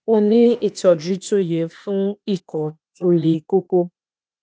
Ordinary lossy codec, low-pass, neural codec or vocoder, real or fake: none; none; codec, 16 kHz, 0.8 kbps, ZipCodec; fake